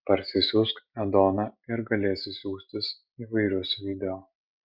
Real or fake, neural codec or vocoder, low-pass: real; none; 5.4 kHz